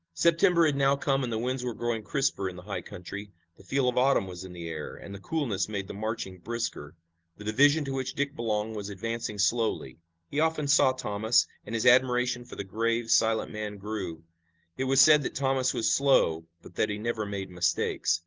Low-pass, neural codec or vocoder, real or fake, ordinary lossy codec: 7.2 kHz; none; real; Opus, 16 kbps